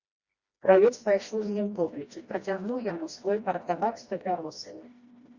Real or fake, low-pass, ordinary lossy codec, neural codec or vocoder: fake; 7.2 kHz; Opus, 64 kbps; codec, 16 kHz, 1 kbps, FreqCodec, smaller model